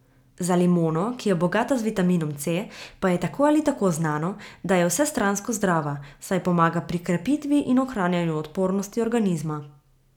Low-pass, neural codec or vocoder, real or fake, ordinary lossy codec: 19.8 kHz; none; real; none